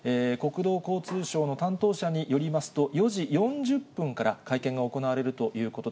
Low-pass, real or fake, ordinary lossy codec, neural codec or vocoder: none; real; none; none